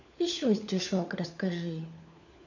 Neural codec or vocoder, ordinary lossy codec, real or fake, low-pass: codec, 16 kHz, 4 kbps, FunCodec, trained on LibriTTS, 50 frames a second; none; fake; 7.2 kHz